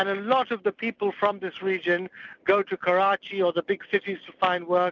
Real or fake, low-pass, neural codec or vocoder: real; 7.2 kHz; none